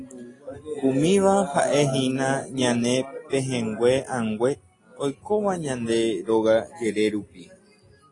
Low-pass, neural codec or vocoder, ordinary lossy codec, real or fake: 10.8 kHz; none; AAC, 32 kbps; real